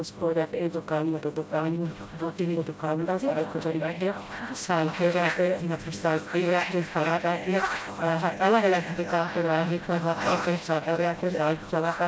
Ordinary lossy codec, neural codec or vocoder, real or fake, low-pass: none; codec, 16 kHz, 0.5 kbps, FreqCodec, smaller model; fake; none